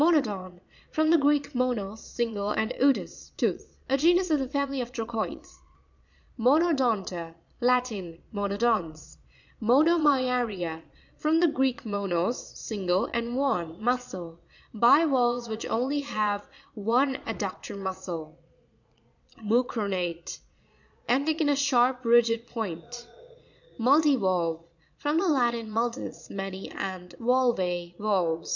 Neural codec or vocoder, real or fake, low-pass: vocoder, 22.05 kHz, 80 mel bands, Vocos; fake; 7.2 kHz